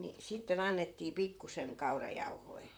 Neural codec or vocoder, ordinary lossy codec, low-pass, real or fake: vocoder, 44.1 kHz, 128 mel bands, Pupu-Vocoder; none; none; fake